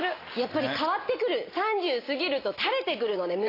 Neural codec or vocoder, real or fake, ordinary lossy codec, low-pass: none; real; AAC, 24 kbps; 5.4 kHz